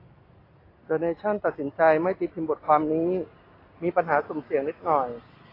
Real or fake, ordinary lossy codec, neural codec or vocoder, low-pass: real; AAC, 24 kbps; none; 5.4 kHz